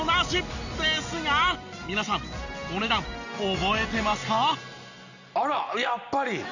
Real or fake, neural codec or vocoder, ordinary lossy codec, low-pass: real; none; none; 7.2 kHz